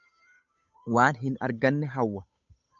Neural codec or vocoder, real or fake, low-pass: codec, 16 kHz, 8 kbps, FunCodec, trained on Chinese and English, 25 frames a second; fake; 7.2 kHz